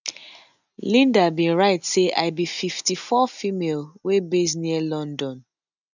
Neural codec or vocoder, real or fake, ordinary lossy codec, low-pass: none; real; none; 7.2 kHz